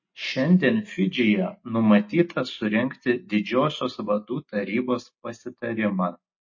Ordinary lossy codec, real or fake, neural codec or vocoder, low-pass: MP3, 32 kbps; real; none; 7.2 kHz